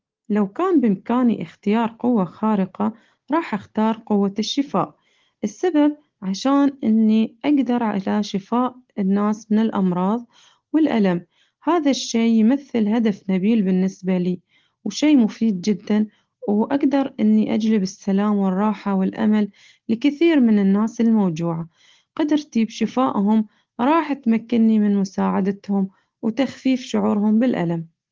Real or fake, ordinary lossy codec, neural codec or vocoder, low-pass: real; Opus, 16 kbps; none; 7.2 kHz